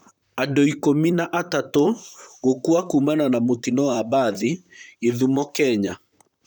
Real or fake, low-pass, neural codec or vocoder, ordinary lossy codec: fake; 19.8 kHz; vocoder, 44.1 kHz, 128 mel bands, Pupu-Vocoder; none